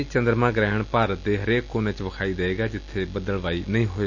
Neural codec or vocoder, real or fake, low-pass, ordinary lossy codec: none; real; 7.2 kHz; none